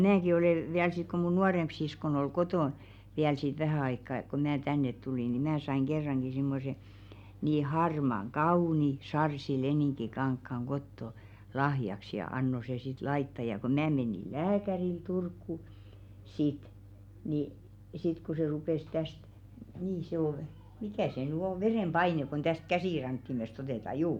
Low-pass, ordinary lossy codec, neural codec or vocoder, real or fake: 19.8 kHz; none; none; real